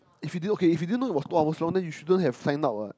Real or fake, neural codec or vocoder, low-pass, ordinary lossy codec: real; none; none; none